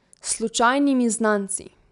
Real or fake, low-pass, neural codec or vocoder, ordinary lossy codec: real; 10.8 kHz; none; none